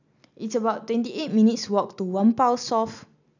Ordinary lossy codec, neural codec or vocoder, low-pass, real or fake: none; none; 7.2 kHz; real